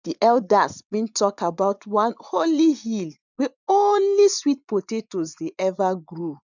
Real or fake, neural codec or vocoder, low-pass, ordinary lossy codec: fake; vocoder, 24 kHz, 100 mel bands, Vocos; 7.2 kHz; none